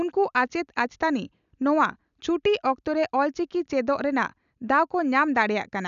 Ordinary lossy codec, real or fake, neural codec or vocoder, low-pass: none; real; none; 7.2 kHz